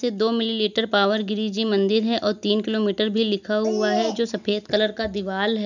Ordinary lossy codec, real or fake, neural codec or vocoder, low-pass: none; real; none; 7.2 kHz